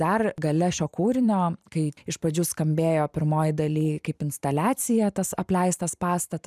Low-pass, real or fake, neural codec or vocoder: 14.4 kHz; fake; vocoder, 44.1 kHz, 128 mel bands every 512 samples, BigVGAN v2